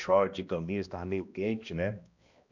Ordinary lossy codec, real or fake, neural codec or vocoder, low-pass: none; fake; codec, 16 kHz, 1 kbps, X-Codec, HuBERT features, trained on balanced general audio; 7.2 kHz